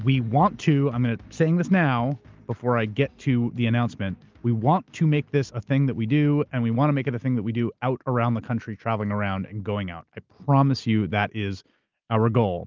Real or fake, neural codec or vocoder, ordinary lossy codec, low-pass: real; none; Opus, 32 kbps; 7.2 kHz